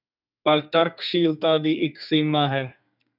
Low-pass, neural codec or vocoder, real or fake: 5.4 kHz; codec, 32 kHz, 1.9 kbps, SNAC; fake